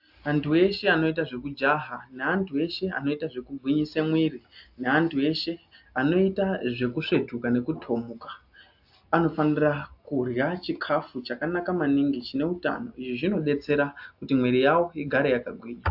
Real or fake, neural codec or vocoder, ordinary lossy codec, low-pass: real; none; AAC, 48 kbps; 5.4 kHz